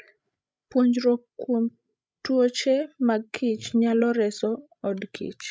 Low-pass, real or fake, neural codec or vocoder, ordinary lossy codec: none; fake; codec, 16 kHz, 16 kbps, FreqCodec, larger model; none